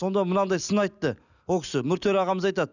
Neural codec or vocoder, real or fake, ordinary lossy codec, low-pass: none; real; none; 7.2 kHz